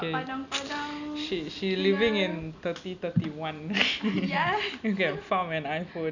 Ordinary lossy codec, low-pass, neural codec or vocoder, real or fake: none; 7.2 kHz; none; real